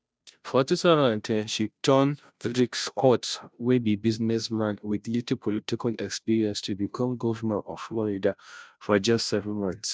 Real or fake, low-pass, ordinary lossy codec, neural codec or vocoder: fake; none; none; codec, 16 kHz, 0.5 kbps, FunCodec, trained on Chinese and English, 25 frames a second